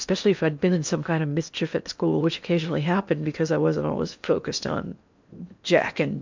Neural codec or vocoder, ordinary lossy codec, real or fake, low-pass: codec, 16 kHz in and 24 kHz out, 0.8 kbps, FocalCodec, streaming, 65536 codes; MP3, 64 kbps; fake; 7.2 kHz